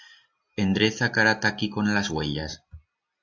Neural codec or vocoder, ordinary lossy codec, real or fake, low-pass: none; AAC, 48 kbps; real; 7.2 kHz